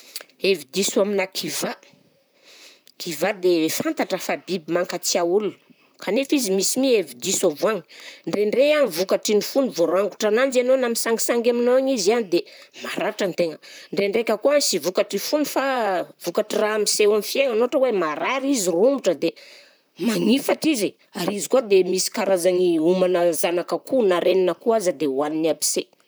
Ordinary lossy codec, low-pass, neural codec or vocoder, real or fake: none; none; vocoder, 44.1 kHz, 128 mel bands, Pupu-Vocoder; fake